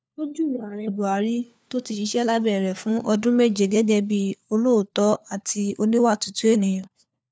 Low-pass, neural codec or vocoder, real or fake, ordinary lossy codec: none; codec, 16 kHz, 4 kbps, FunCodec, trained on LibriTTS, 50 frames a second; fake; none